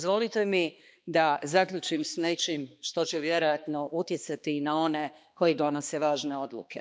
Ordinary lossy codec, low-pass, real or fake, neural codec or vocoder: none; none; fake; codec, 16 kHz, 2 kbps, X-Codec, HuBERT features, trained on balanced general audio